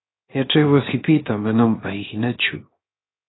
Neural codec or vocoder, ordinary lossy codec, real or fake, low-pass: codec, 16 kHz, 0.7 kbps, FocalCodec; AAC, 16 kbps; fake; 7.2 kHz